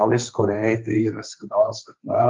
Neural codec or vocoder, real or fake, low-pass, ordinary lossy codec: codec, 16 kHz, 1.1 kbps, Voila-Tokenizer; fake; 7.2 kHz; Opus, 32 kbps